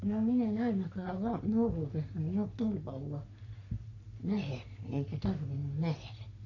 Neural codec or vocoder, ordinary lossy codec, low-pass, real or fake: codec, 44.1 kHz, 3.4 kbps, Pupu-Codec; none; 7.2 kHz; fake